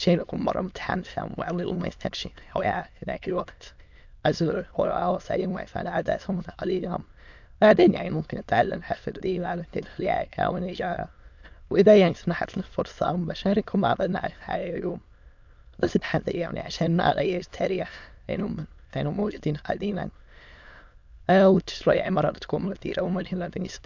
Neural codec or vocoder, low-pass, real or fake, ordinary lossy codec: autoencoder, 22.05 kHz, a latent of 192 numbers a frame, VITS, trained on many speakers; 7.2 kHz; fake; MP3, 64 kbps